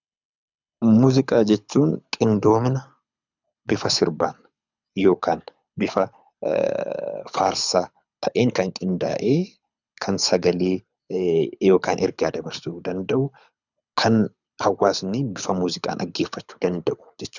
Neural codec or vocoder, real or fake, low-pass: codec, 24 kHz, 6 kbps, HILCodec; fake; 7.2 kHz